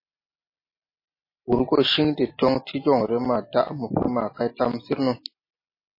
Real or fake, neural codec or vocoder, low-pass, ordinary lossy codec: real; none; 5.4 kHz; MP3, 24 kbps